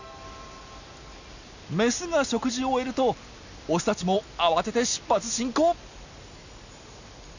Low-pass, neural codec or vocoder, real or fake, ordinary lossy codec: 7.2 kHz; none; real; none